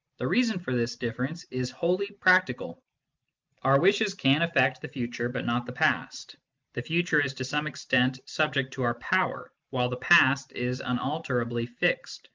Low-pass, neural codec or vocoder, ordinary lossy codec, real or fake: 7.2 kHz; none; Opus, 24 kbps; real